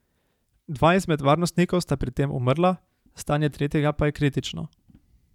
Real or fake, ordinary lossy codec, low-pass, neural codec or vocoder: fake; none; 19.8 kHz; vocoder, 44.1 kHz, 128 mel bands every 512 samples, BigVGAN v2